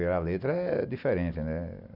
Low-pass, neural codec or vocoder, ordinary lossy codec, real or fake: 5.4 kHz; none; Opus, 64 kbps; real